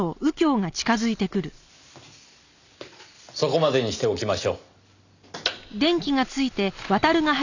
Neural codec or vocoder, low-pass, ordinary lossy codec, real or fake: none; 7.2 kHz; none; real